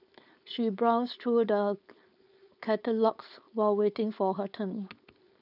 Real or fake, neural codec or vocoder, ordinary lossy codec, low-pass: fake; codec, 16 kHz, 4.8 kbps, FACodec; none; 5.4 kHz